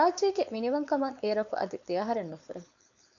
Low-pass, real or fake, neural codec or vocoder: 7.2 kHz; fake; codec, 16 kHz, 4.8 kbps, FACodec